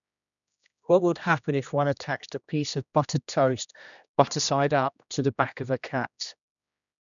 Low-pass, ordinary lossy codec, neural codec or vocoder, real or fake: 7.2 kHz; none; codec, 16 kHz, 1 kbps, X-Codec, HuBERT features, trained on general audio; fake